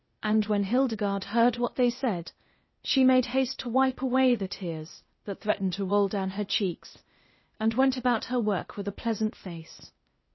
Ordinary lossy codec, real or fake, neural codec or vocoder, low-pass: MP3, 24 kbps; fake; codec, 16 kHz, 0.8 kbps, ZipCodec; 7.2 kHz